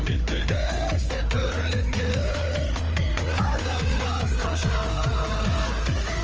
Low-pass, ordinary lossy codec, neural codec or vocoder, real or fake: 7.2 kHz; Opus, 24 kbps; codec, 16 kHz, 4 kbps, FreqCodec, larger model; fake